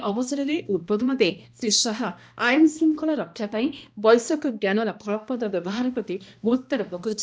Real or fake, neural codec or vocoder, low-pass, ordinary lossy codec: fake; codec, 16 kHz, 1 kbps, X-Codec, HuBERT features, trained on balanced general audio; none; none